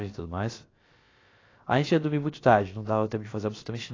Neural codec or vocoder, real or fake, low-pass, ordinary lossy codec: codec, 16 kHz, about 1 kbps, DyCAST, with the encoder's durations; fake; 7.2 kHz; AAC, 32 kbps